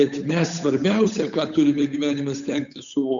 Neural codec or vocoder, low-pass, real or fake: codec, 16 kHz, 8 kbps, FunCodec, trained on Chinese and English, 25 frames a second; 7.2 kHz; fake